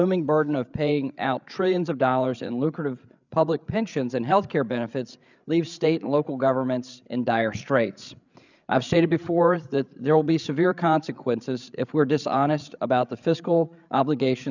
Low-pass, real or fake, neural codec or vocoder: 7.2 kHz; fake; codec, 16 kHz, 8 kbps, FreqCodec, larger model